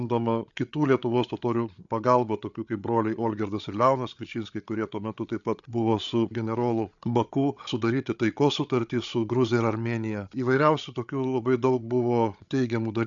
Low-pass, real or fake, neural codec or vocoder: 7.2 kHz; fake; codec, 16 kHz, 8 kbps, FreqCodec, larger model